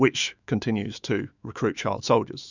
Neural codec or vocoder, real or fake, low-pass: autoencoder, 48 kHz, 128 numbers a frame, DAC-VAE, trained on Japanese speech; fake; 7.2 kHz